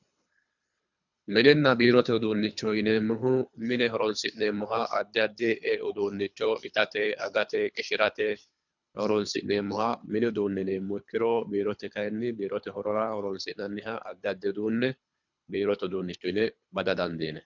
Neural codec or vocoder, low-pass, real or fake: codec, 24 kHz, 3 kbps, HILCodec; 7.2 kHz; fake